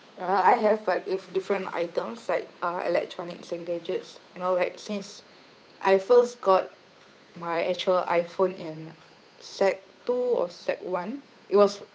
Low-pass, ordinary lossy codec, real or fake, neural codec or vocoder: none; none; fake; codec, 16 kHz, 8 kbps, FunCodec, trained on Chinese and English, 25 frames a second